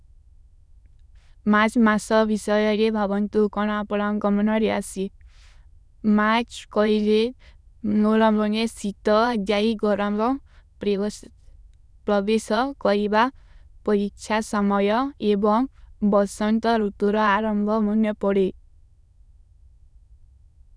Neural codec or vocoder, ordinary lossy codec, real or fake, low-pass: autoencoder, 22.05 kHz, a latent of 192 numbers a frame, VITS, trained on many speakers; none; fake; none